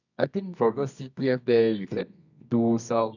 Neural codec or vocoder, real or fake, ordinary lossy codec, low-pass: codec, 24 kHz, 0.9 kbps, WavTokenizer, medium music audio release; fake; none; 7.2 kHz